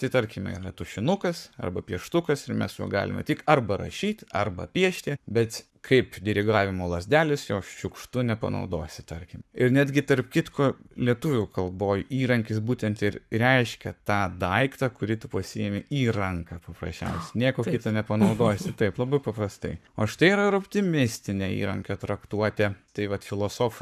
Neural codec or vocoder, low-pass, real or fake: codec, 44.1 kHz, 7.8 kbps, Pupu-Codec; 14.4 kHz; fake